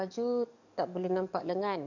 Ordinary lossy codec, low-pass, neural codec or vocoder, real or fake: none; 7.2 kHz; codec, 44.1 kHz, 7.8 kbps, DAC; fake